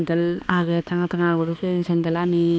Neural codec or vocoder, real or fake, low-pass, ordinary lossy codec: codec, 16 kHz, 2 kbps, X-Codec, HuBERT features, trained on balanced general audio; fake; none; none